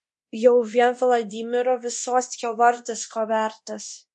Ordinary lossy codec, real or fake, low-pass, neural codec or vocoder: MP3, 48 kbps; fake; 10.8 kHz; codec, 24 kHz, 0.9 kbps, DualCodec